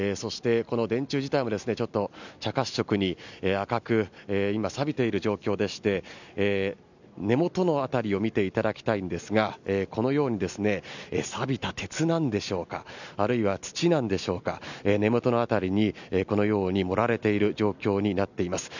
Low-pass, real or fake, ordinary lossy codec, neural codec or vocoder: 7.2 kHz; real; none; none